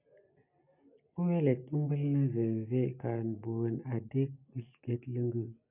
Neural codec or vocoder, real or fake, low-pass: none; real; 3.6 kHz